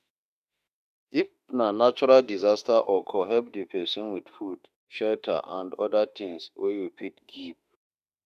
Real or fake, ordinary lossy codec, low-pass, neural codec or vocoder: fake; none; 14.4 kHz; autoencoder, 48 kHz, 32 numbers a frame, DAC-VAE, trained on Japanese speech